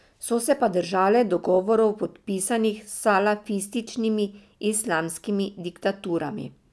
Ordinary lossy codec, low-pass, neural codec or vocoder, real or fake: none; none; none; real